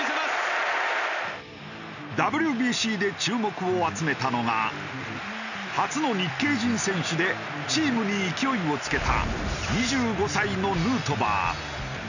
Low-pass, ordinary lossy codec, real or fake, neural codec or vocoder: 7.2 kHz; none; real; none